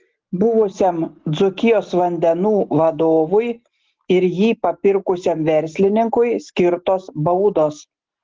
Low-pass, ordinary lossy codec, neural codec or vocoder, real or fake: 7.2 kHz; Opus, 16 kbps; none; real